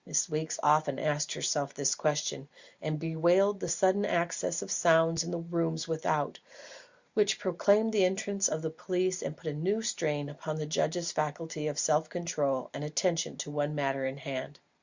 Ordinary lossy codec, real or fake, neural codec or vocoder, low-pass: Opus, 64 kbps; real; none; 7.2 kHz